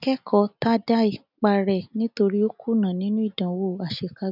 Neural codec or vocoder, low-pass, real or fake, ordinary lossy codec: none; 5.4 kHz; real; none